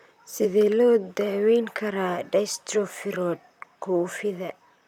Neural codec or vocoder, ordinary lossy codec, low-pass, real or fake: vocoder, 44.1 kHz, 128 mel bands, Pupu-Vocoder; none; 19.8 kHz; fake